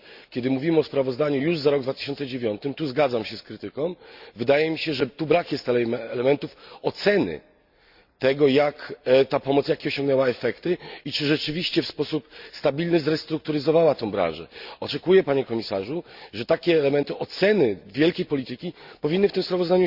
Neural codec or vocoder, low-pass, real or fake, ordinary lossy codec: none; 5.4 kHz; real; Opus, 64 kbps